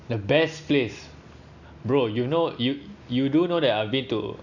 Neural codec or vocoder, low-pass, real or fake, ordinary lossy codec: none; 7.2 kHz; real; none